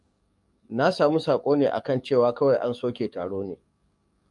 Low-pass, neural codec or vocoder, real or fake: 10.8 kHz; codec, 44.1 kHz, 7.8 kbps, DAC; fake